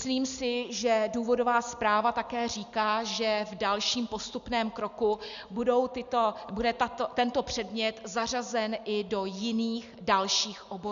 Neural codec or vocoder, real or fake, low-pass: none; real; 7.2 kHz